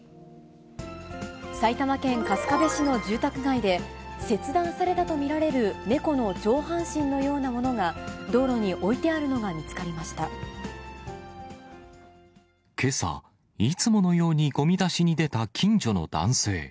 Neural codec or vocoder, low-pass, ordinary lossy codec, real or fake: none; none; none; real